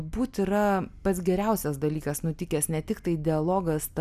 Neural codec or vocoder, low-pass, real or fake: none; 14.4 kHz; real